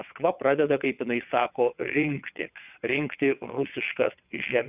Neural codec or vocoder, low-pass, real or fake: vocoder, 22.05 kHz, 80 mel bands, WaveNeXt; 3.6 kHz; fake